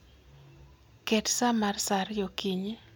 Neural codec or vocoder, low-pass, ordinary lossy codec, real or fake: none; none; none; real